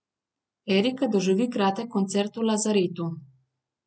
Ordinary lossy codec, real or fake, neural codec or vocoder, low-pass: none; real; none; none